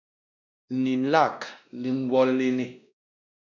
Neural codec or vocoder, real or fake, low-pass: codec, 16 kHz, 1 kbps, X-Codec, WavLM features, trained on Multilingual LibriSpeech; fake; 7.2 kHz